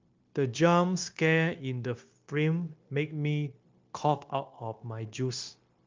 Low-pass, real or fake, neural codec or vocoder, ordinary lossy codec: 7.2 kHz; fake; codec, 16 kHz, 0.9 kbps, LongCat-Audio-Codec; Opus, 24 kbps